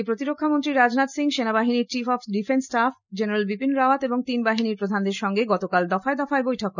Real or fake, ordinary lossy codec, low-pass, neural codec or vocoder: real; none; 7.2 kHz; none